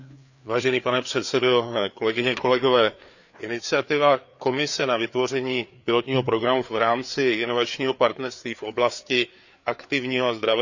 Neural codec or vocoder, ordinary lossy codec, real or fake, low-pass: codec, 16 kHz, 4 kbps, FreqCodec, larger model; none; fake; 7.2 kHz